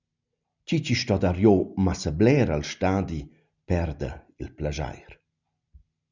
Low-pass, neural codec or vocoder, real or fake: 7.2 kHz; none; real